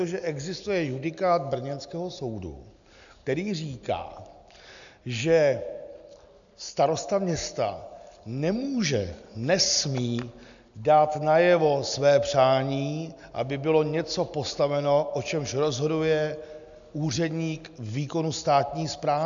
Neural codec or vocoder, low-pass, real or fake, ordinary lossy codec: none; 7.2 kHz; real; MP3, 96 kbps